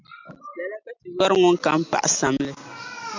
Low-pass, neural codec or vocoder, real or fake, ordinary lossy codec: 7.2 kHz; none; real; MP3, 64 kbps